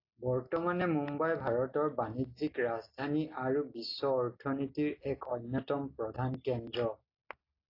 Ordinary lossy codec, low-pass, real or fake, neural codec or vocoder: AAC, 32 kbps; 5.4 kHz; real; none